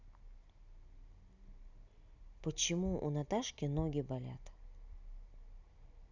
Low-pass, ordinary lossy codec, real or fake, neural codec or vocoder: 7.2 kHz; none; real; none